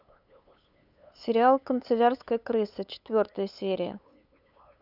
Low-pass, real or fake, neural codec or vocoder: 5.4 kHz; fake; codec, 16 kHz, 8 kbps, FunCodec, trained on LibriTTS, 25 frames a second